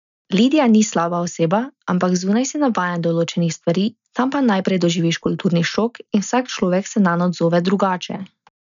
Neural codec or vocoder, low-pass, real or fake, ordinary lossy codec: none; 7.2 kHz; real; none